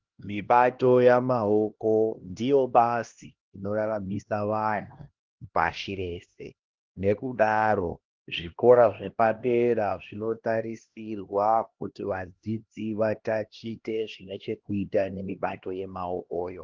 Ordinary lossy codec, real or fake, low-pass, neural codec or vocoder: Opus, 16 kbps; fake; 7.2 kHz; codec, 16 kHz, 1 kbps, X-Codec, HuBERT features, trained on LibriSpeech